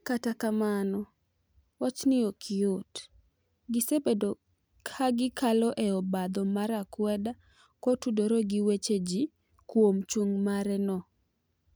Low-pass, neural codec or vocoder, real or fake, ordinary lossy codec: none; none; real; none